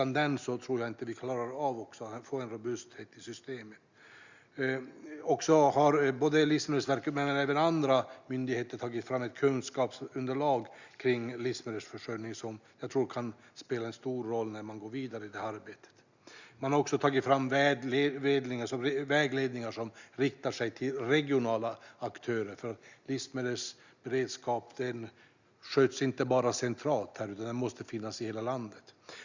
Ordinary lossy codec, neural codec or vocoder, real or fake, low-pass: Opus, 64 kbps; none; real; 7.2 kHz